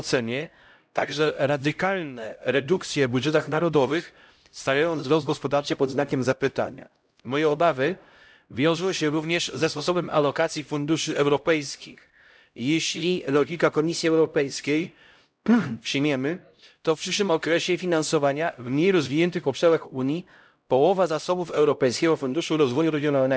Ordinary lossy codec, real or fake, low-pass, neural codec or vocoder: none; fake; none; codec, 16 kHz, 0.5 kbps, X-Codec, HuBERT features, trained on LibriSpeech